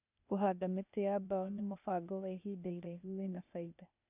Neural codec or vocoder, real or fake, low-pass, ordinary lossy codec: codec, 16 kHz, 0.8 kbps, ZipCodec; fake; 3.6 kHz; none